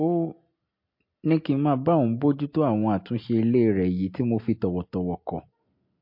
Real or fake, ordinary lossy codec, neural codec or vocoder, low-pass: real; MP3, 32 kbps; none; 5.4 kHz